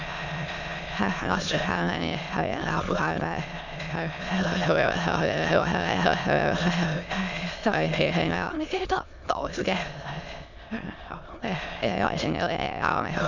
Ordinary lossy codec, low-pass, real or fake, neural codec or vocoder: none; 7.2 kHz; fake; autoencoder, 22.05 kHz, a latent of 192 numbers a frame, VITS, trained on many speakers